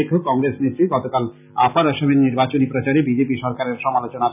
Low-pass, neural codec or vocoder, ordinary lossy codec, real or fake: 3.6 kHz; none; none; real